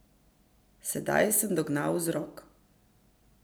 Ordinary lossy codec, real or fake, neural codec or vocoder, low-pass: none; real; none; none